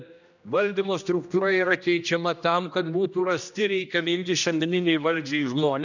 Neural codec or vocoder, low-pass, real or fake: codec, 16 kHz, 1 kbps, X-Codec, HuBERT features, trained on general audio; 7.2 kHz; fake